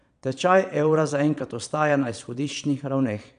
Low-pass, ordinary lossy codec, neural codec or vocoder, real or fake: 9.9 kHz; none; vocoder, 22.05 kHz, 80 mel bands, Vocos; fake